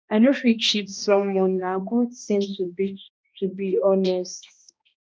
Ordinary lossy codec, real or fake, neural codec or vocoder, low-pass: none; fake; codec, 16 kHz, 1 kbps, X-Codec, HuBERT features, trained on balanced general audio; none